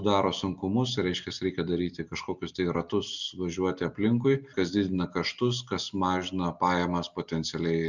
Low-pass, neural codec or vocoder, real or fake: 7.2 kHz; none; real